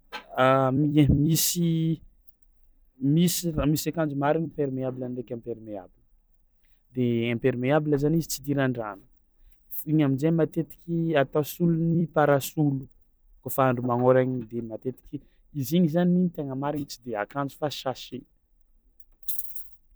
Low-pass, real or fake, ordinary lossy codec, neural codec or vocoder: none; fake; none; vocoder, 44.1 kHz, 128 mel bands every 256 samples, BigVGAN v2